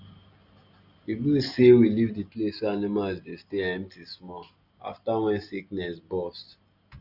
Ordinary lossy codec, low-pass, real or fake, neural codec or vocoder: none; 5.4 kHz; real; none